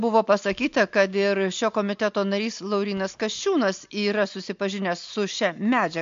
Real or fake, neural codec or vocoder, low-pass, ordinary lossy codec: real; none; 7.2 kHz; MP3, 48 kbps